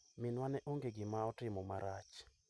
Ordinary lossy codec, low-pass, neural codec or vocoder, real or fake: none; none; none; real